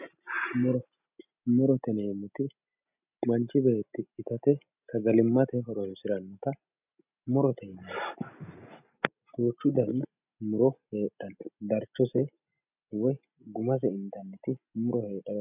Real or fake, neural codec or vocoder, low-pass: real; none; 3.6 kHz